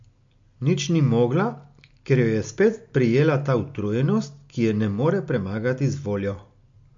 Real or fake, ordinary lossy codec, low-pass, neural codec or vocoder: real; MP3, 48 kbps; 7.2 kHz; none